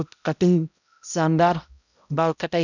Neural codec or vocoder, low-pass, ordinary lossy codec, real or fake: codec, 16 kHz, 0.5 kbps, X-Codec, HuBERT features, trained on balanced general audio; 7.2 kHz; none; fake